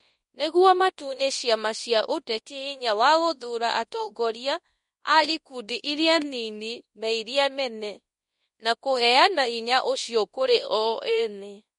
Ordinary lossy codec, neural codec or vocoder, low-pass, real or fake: MP3, 48 kbps; codec, 24 kHz, 0.9 kbps, WavTokenizer, large speech release; 10.8 kHz; fake